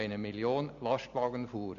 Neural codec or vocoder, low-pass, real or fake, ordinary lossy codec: none; 7.2 kHz; real; none